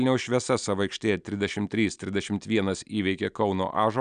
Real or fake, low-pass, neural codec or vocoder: real; 9.9 kHz; none